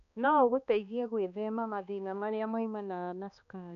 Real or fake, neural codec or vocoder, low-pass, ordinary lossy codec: fake; codec, 16 kHz, 2 kbps, X-Codec, HuBERT features, trained on balanced general audio; 7.2 kHz; none